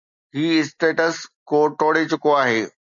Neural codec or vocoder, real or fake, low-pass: none; real; 7.2 kHz